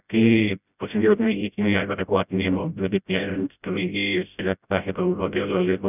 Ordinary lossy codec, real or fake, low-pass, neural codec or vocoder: none; fake; 3.6 kHz; codec, 16 kHz, 0.5 kbps, FreqCodec, smaller model